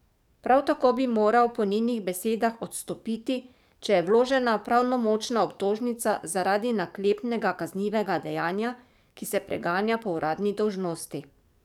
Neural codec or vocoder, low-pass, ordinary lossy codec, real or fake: codec, 44.1 kHz, 7.8 kbps, DAC; 19.8 kHz; none; fake